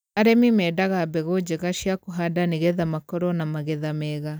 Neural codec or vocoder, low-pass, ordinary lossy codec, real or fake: none; none; none; real